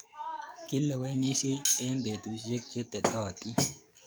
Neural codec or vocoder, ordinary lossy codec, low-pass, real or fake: codec, 44.1 kHz, 7.8 kbps, DAC; none; none; fake